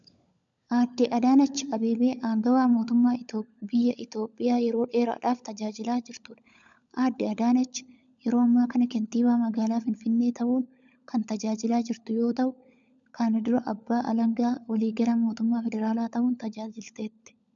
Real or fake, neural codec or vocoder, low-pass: fake; codec, 16 kHz, 16 kbps, FunCodec, trained on LibriTTS, 50 frames a second; 7.2 kHz